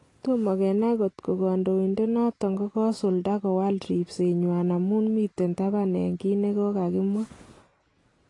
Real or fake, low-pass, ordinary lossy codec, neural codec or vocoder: real; 10.8 kHz; AAC, 32 kbps; none